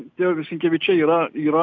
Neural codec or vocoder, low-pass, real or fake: none; 7.2 kHz; real